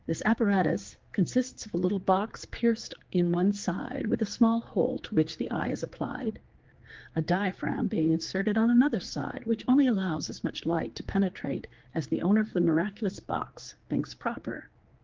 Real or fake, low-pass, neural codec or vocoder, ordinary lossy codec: fake; 7.2 kHz; codec, 16 kHz, 4 kbps, X-Codec, HuBERT features, trained on general audio; Opus, 16 kbps